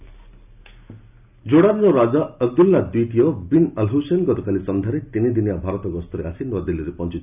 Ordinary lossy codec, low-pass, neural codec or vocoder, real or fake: none; 3.6 kHz; none; real